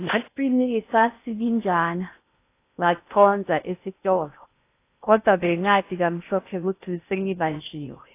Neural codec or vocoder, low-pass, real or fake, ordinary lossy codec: codec, 16 kHz in and 24 kHz out, 0.6 kbps, FocalCodec, streaming, 4096 codes; 3.6 kHz; fake; AAC, 24 kbps